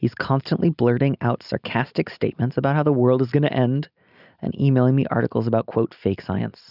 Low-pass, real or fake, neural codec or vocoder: 5.4 kHz; real; none